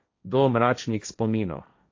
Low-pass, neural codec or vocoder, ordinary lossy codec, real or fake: 7.2 kHz; codec, 16 kHz, 1.1 kbps, Voila-Tokenizer; AAC, 48 kbps; fake